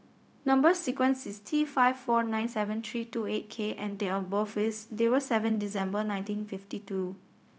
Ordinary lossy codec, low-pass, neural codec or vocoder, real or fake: none; none; codec, 16 kHz, 0.4 kbps, LongCat-Audio-Codec; fake